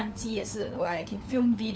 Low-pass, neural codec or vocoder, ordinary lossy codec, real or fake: none; codec, 16 kHz, 4 kbps, FunCodec, trained on LibriTTS, 50 frames a second; none; fake